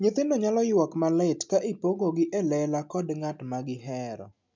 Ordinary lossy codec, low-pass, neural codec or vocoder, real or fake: MP3, 64 kbps; 7.2 kHz; none; real